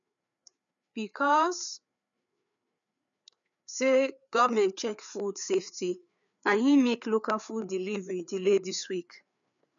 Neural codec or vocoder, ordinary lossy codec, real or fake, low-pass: codec, 16 kHz, 4 kbps, FreqCodec, larger model; none; fake; 7.2 kHz